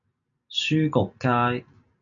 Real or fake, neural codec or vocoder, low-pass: real; none; 7.2 kHz